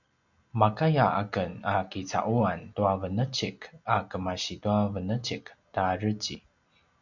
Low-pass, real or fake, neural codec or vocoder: 7.2 kHz; real; none